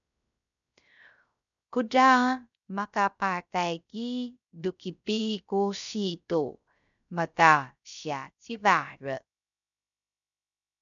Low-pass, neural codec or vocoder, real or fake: 7.2 kHz; codec, 16 kHz, 0.3 kbps, FocalCodec; fake